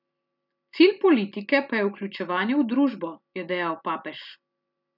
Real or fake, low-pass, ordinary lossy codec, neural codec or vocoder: real; 5.4 kHz; none; none